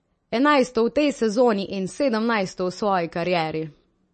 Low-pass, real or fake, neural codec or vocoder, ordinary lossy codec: 10.8 kHz; real; none; MP3, 32 kbps